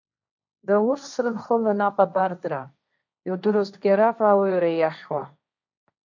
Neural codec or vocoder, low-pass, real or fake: codec, 16 kHz, 1.1 kbps, Voila-Tokenizer; 7.2 kHz; fake